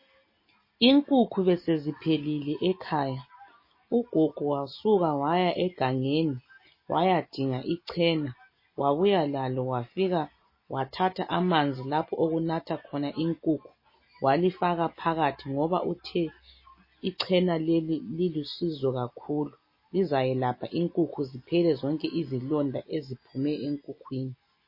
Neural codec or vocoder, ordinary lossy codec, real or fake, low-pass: none; MP3, 24 kbps; real; 5.4 kHz